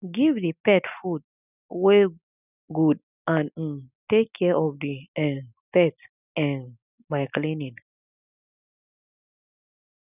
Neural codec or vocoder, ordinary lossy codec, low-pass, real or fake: none; none; 3.6 kHz; real